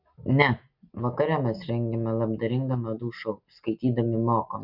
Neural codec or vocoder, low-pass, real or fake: none; 5.4 kHz; real